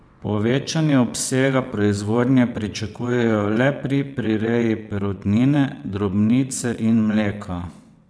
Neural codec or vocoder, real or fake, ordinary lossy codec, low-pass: vocoder, 22.05 kHz, 80 mel bands, WaveNeXt; fake; none; none